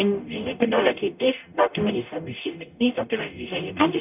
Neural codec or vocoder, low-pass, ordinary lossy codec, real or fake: codec, 44.1 kHz, 0.9 kbps, DAC; 3.6 kHz; none; fake